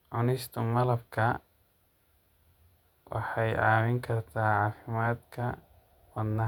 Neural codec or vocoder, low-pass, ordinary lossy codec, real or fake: vocoder, 48 kHz, 128 mel bands, Vocos; 19.8 kHz; none; fake